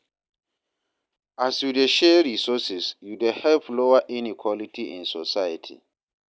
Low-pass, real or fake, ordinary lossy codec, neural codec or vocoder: none; real; none; none